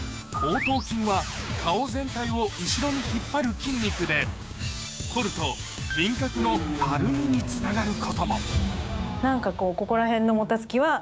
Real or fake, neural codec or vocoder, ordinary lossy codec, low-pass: fake; codec, 16 kHz, 6 kbps, DAC; none; none